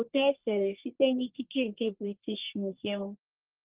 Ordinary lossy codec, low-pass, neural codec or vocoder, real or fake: Opus, 16 kbps; 3.6 kHz; codec, 44.1 kHz, 1.7 kbps, Pupu-Codec; fake